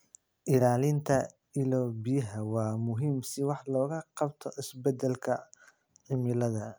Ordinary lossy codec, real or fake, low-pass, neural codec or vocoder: none; real; none; none